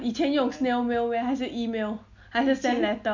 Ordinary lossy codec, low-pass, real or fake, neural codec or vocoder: none; 7.2 kHz; real; none